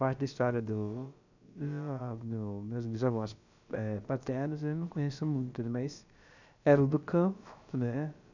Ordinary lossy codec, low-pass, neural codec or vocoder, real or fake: none; 7.2 kHz; codec, 16 kHz, about 1 kbps, DyCAST, with the encoder's durations; fake